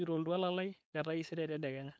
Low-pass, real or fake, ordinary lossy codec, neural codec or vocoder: none; fake; none; codec, 16 kHz, 4.8 kbps, FACodec